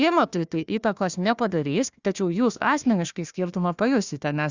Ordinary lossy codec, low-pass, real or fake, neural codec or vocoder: Opus, 64 kbps; 7.2 kHz; fake; codec, 16 kHz, 1 kbps, FunCodec, trained on Chinese and English, 50 frames a second